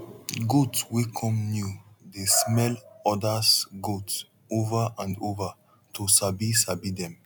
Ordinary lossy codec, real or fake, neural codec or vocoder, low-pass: none; real; none; none